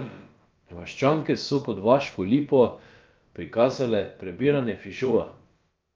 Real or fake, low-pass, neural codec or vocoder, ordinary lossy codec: fake; 7.2 kHz; codec, 16 kHz, about 1 kbps, DyCAST, with the encoder's durations; Opus, 24 kbps